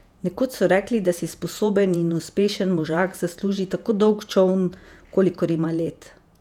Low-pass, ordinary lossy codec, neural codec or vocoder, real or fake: 19.8 kHz; none; vocoder, 48 kHz, 128 mel bands, Vocos; fake